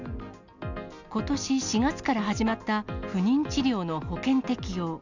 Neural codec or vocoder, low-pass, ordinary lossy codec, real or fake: none; 7.2 kHz; none; real